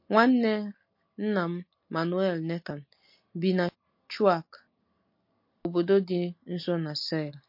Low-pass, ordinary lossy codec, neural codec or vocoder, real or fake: 5.4 kHz; MP3, 32 kbps; none; real